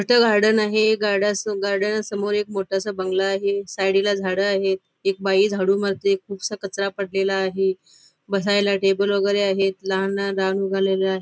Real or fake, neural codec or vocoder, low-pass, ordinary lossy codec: real; none; none; none